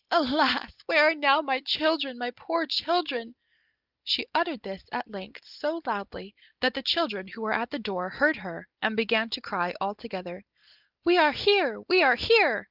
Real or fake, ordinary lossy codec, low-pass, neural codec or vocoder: real; Opus, 32 kbps; 5.4 kHz; none